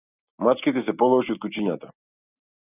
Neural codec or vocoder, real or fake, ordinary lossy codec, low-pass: none; real; AAC, 24 kbps; 3.6 kHz